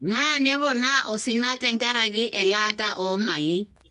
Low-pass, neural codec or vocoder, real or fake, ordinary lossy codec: 10.8 kHz; codec, 24 kHz, 0.9 kbps, WavTokenizer, medium music audio release; fake; MP3, 64 kbps